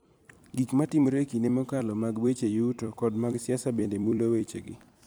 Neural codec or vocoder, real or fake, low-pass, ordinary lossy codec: vocoder, 44.1 kHz, 128 mel bands every 256 samples, BigVGAN v2; fake; none; none